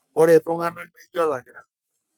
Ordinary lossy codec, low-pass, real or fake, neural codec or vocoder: none; none; fake; codec, 44.1 kHz, 3.4 kbps, Pupu-Codec